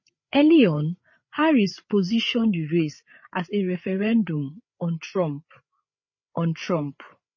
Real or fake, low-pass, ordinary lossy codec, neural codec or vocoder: fake; 7.2 kHz; MP3, 32 kbps; codec, 16 kHz, 8 kbps, FreqCodec, larger model